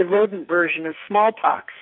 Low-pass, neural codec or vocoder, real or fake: 5.4 kHz; codec, 44.1 kHz, 2.6 kbps, SNAC; fake